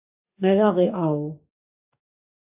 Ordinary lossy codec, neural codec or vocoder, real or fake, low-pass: AAC, 32 kbps; codec, 44.1 kHz, 2.6 kbps, DAC; fake; 3.6 kHz